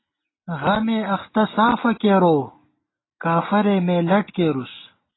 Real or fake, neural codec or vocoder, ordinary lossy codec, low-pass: real; none; AAC, 16 kbps; 7.2 kHz